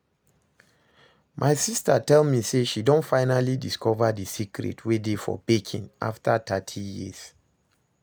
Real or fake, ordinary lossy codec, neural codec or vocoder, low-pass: real; none; none; none